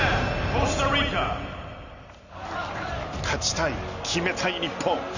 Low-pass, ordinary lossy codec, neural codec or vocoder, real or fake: 7.2 kHz; none; none; real